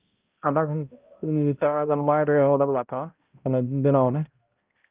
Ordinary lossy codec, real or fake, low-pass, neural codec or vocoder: Opus, 24 kbps; fake; 3.6 kHz; codec, 16 kHz, 0.5 kbps, X-Codec, HuBERT features, trained on balanced general audio